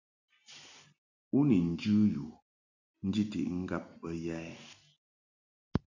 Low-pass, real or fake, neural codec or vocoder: 7.2 kHz; real; none